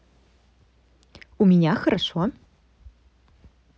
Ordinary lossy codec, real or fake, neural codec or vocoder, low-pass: none; real; none; none